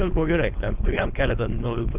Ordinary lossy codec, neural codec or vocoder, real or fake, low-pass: Opus, 24 kbps; codec, 16 kHz, 4.8 kbps, FACodec; fake; 3.6 kHz